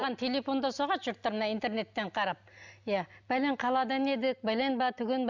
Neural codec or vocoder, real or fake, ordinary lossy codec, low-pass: none; real; none; 7.2 kHz